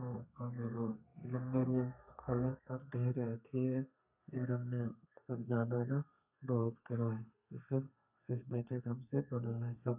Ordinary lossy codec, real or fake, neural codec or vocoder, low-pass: none; fake; codec, 32 kHz, 1.9 kbps, SNAC; 3.6 kHz